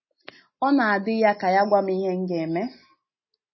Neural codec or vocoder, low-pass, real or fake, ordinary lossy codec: none; 7.2 kHz; real; MP3, 24 kbps